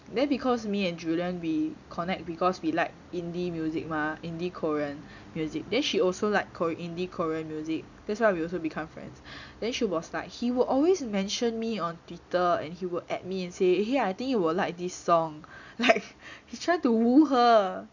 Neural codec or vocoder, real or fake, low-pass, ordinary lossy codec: none; real; 7.2 kHz; none